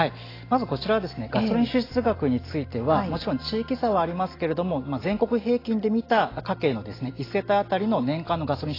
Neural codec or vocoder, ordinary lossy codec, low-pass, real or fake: none; AAC, 24 kbps; 5.4 kHz; real